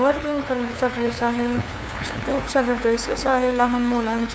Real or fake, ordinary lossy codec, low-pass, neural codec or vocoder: fake; none; none; codec, 16 kHz, 2 kbps, FunCodec, trained on LibriTTS, 25 frames a second